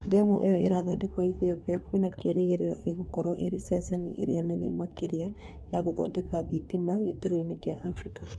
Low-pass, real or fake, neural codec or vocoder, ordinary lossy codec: none; fake; codec, 24 kHz, 1 kbps, SNAC; none